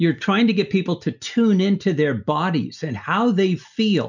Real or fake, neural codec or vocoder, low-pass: real; none; 7.2 kHz